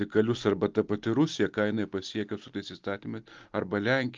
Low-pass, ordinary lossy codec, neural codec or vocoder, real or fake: 7.2 kHz; Opus, 24 kbps; none; real